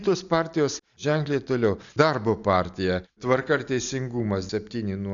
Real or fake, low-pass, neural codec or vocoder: real; 7.2 kHz; none